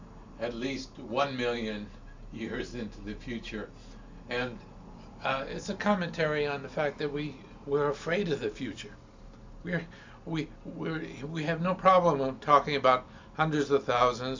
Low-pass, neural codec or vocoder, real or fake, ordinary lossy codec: 7.2 kHz; none; real; MP3, 64 kbps